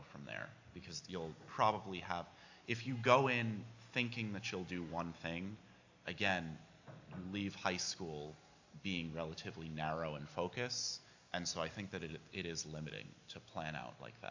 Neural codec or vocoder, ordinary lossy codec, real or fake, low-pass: none; AAC, 48 kbps; real; 7.2 kHz